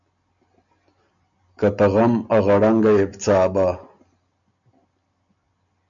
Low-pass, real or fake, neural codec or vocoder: 7.2 kHz; real; none